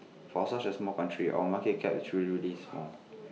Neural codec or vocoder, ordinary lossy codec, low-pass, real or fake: none; none; none; real